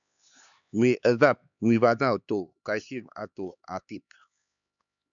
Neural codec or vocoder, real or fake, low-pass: codec, 16 kHz, 4 kbps, X-Codec, HuBERT features, trained on LibriSpeech; fake; 7.2 kHz